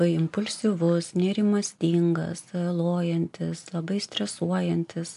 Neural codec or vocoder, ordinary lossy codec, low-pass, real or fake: none; MP3, 64 kbps; 10.8 kHz; real